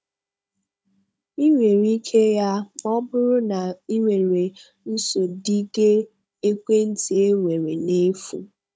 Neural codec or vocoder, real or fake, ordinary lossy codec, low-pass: codec, 16 kHz, 16 kbps, FunCodec, trained on Chinese and English, 50 frames a second; fake; none; none